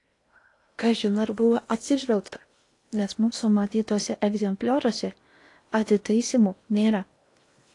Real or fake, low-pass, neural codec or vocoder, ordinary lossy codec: fake; 10.8 kHz; codec, 16 kHz in and 24 kHz out, 0.8 kbps, FocalCodec, streaming, 65536 codes; AAC, 48 kbps